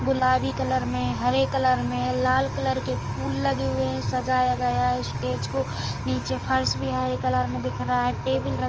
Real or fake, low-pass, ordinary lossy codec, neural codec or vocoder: fake; 7.2 kHz; Opus, 24 kbps; codec, 44.1 kHz, 7.8 kbps, DAC